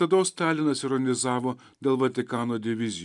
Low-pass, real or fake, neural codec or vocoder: 10.8 kHz; real; none